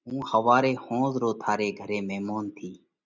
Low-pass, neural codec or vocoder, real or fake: 7.2 kHz; none; real